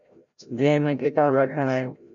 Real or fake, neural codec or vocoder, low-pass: fake; codec, 16 kHz, 0.5 kbps, FreqCodec, larger model; 7.2 kHz